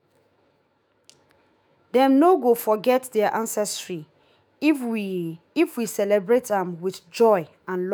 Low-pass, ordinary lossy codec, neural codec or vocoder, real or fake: none; none; autoencoder, 48 kHz, 128 numbers a frame, DAC-VAE, trained on Japanese speech; fake